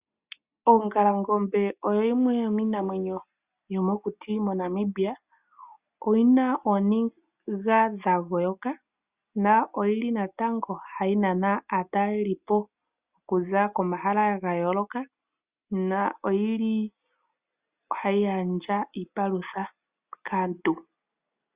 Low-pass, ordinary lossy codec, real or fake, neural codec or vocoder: 3.6 kHz; Opus, 64 kbps; real; none